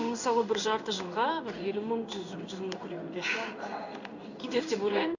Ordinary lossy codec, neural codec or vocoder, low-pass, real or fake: none; codec, 16 kHz in and 24 kHz out, 1 kbps, XY-Tokenizer; 7.2 kHz; fake